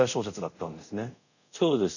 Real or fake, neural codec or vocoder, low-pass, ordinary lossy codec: fake; codec, 24 kHz, 0.5 kbps, DualCodec; 7.2 kHz; none